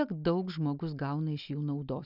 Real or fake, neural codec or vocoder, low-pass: real; none; 5.4 kHz